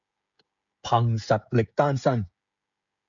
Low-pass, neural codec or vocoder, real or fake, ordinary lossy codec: 7.2 kHz; codec, 16 kHz, 16 kbps, FreqCodec, smaller model; fake; MP3, 64 kbps